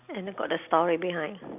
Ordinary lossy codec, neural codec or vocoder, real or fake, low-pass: none; none; real; 3.6 kHz